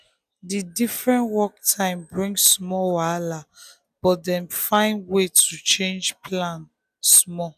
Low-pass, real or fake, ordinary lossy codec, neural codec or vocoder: 14.4 kHz; real; none; none